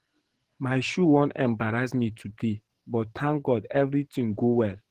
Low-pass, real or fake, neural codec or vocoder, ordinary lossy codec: 14.4 kHz; fake; codec, 44.1 kHz, 7.8 kbps, DAC; Opus, 16 kbps